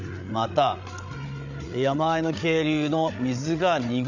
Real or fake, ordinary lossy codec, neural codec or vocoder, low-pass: fake; none; codec, 16 kHz, 8 kbps, FreqCodec, larger model; 7.2 kHz